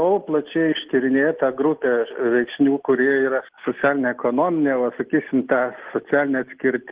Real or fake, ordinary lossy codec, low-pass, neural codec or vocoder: real; Opus, 16 kbps; 3.6 kHz; none